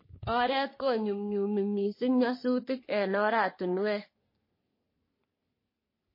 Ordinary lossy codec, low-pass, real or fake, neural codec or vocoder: MP3, 24 kbps; 5.4 kHz; fake; codec, 44.1 kHz, 3.4 kbps, Pupu-Codec